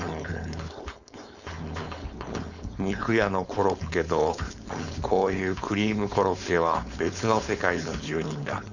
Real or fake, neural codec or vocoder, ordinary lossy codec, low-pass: fake; codec, 16 kHz, 4.8 kbps, FACodec; none; 7.2 kHz